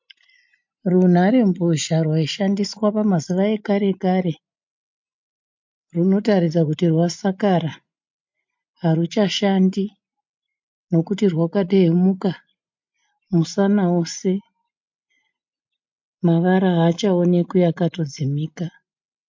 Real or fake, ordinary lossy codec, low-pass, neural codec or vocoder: real; MP3, 48 kbps; 7.2 kHz; none